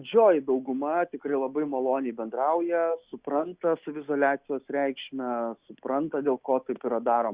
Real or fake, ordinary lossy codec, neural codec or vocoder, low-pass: real; Opus, 64 kbps; none; 3.6 kHz